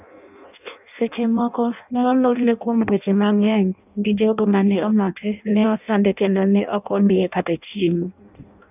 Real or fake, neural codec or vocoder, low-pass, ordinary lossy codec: fake; codec, 16 kHz in and 24 kHz out, 0.6 kbps, FireRedTTS-2 codec; 3.6 kHz; none